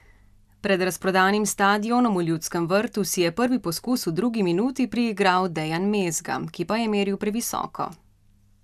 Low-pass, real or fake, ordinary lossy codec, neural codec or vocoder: 14.4 kHz; real; none; none